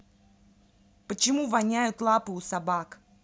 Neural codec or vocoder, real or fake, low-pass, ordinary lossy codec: none; real; none; none